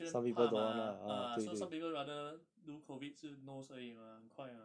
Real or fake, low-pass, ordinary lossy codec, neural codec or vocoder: real; 9.9 kHz; none; none